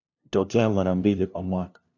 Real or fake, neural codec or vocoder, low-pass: fake; codec, 16 kHz, 0.5 kbps, FunCodec, trained on LibriTTS, 25 frames a second; 7.2 kHz